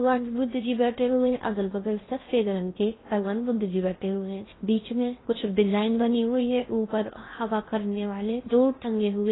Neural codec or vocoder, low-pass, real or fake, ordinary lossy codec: codec, 16 kHz in and 24 kHz out, 0.6 kbps, FocalCodec, streaming, 4096 codes; 7.2 kHz; fake; AAC, 16 kbps